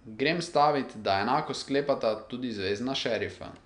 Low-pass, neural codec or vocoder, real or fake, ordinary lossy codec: 9.9 kHz; none; real; MP3, 96 kbps